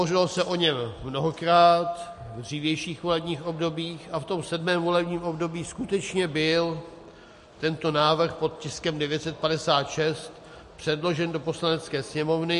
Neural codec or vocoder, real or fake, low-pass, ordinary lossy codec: none; real; 14.4 kHz; MP3, 48 kbps